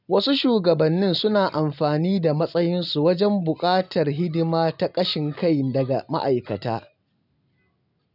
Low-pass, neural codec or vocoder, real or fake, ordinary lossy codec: 5.4 kHz; none; real; none